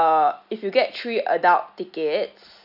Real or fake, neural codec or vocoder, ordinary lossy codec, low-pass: real; none; none; 5.4 kHz